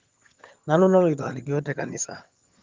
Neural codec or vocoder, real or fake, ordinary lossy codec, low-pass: vocoder, 22.05 kHz, 80 mel bands, HiFi-GAN; fake; Opus, 32 kbps; 7.2 kHz